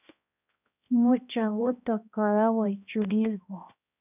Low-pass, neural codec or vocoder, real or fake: 3.6 kHz; codec, 16 kHz, 1 kbps, X-Codec, HuBERT features, trained on balanced general audio; fake